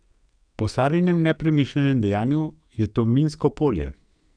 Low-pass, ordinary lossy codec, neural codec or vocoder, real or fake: 9.9 kHz; none; codec, 44.1 kHz, 2.6 kbps, SNAC; fake